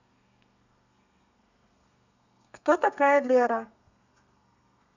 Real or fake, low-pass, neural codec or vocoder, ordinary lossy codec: fake; 7.2 kHz; codec, 32 kHz, 1.9 kbps, SNAC; none